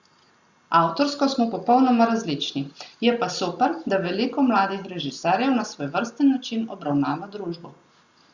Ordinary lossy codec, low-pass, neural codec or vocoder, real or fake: Opus, 64 kbps; 7.2 kHz; none; real